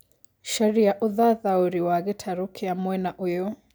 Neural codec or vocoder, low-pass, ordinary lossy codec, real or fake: none; none; none; real